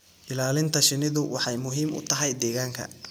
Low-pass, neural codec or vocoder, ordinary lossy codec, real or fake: none; none; none; real